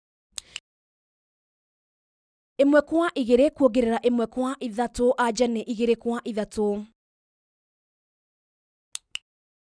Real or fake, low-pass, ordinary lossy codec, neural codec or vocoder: real; 9.9 kHz; none; none